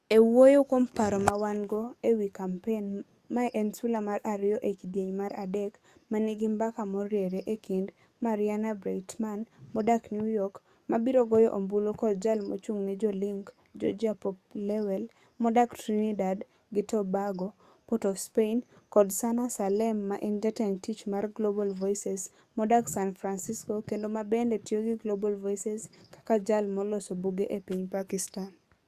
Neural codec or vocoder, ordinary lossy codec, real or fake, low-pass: codec, 44.1 kHz, 7.8 kbps, DAC; Opus, 64 kbps; fake; 14.4 kHz